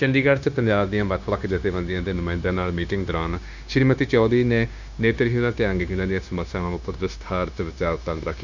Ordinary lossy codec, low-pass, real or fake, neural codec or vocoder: none; 7.2 kHz; fake; codec, 16 kHz, 0.9 kbps, LongCat-Audio-Codec